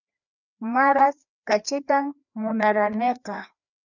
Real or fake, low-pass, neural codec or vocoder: fake; 7.2 kHz; codec, 16 kHz, 2 kbps, FreqCodec, larger model